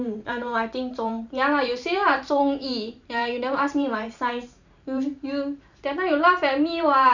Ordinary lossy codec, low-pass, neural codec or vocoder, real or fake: none; 7.2 kHz; vocoder, 44.1 kHz, 128 mel bands every 512 samples, BigVGAN v2; fake